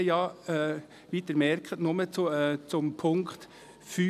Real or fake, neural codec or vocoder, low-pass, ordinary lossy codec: real; none; 14.4 kHz; none